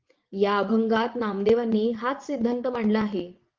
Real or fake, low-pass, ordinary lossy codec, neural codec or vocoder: fake; 7.2 kHz; Opus, 32 kbps; vocoder, 22.05 kHz, 80 mel bands, WaveNeXt